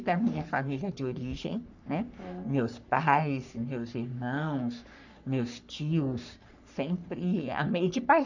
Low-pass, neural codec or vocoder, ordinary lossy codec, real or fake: 7.2 kHz; codec, 44.1 kHz, 3.4 kbps, Pupu-Codec; none; fake